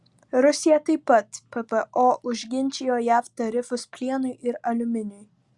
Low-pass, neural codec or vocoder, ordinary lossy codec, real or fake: 10.8 kHz; none; Opus, 64 kbps; real